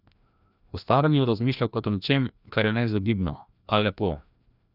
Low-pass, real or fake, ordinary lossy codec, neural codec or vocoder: 5.4 kHz; fake; none; codec, 16 kHz, 1 kbps, FreqCodec, larger model